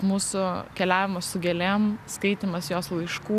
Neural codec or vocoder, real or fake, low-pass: none; real; 14.4 kHz